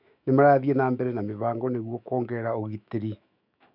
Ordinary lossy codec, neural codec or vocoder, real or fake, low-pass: none; autoencoder, 48 kHz, 128 numbers a frame, DAC-VAE, trained on Japanese speech; fake; 5.4 kHz